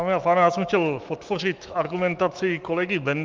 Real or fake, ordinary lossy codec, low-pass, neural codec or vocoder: fake; Opus, 24 kbps; 7.2 kHz; autoencoder, 48 kHz, 128 numbers a frame, DAC-VAE, trained on Japanese speech